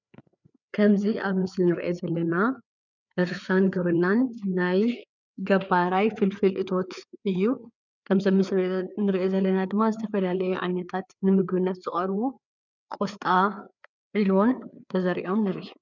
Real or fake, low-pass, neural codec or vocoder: fake; 7.2 kHz; codec, 16 kHz, 4 kbps, FreqCodec, larger model